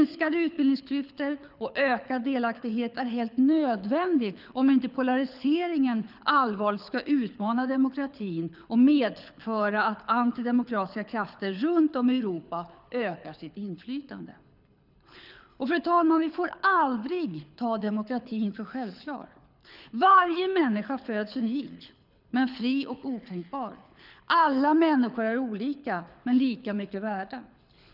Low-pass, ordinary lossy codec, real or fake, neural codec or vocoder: 5.4 kHz; none; fake; codec, 24 kHz, 6 kbps, HILCodec